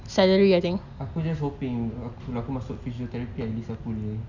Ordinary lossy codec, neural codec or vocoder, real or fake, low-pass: none; none; real; 7.2 kHz